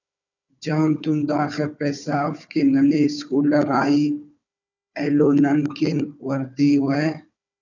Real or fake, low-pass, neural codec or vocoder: fake; 7.2 kHz; codec, 16 kHz, 4 kbps, FunCodec, trained on Chinese and English, 50 frames a second